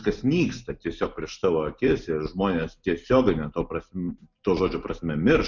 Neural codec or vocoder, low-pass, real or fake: none; 7.2 kHz; real